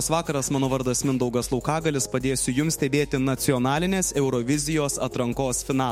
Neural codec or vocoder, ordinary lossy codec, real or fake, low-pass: autoencoder, 48 kHz, 128 numbers a frame, DAC-VAE, trained on Japanese speech; MP3, 64 kbps; fake; 19.8 kHz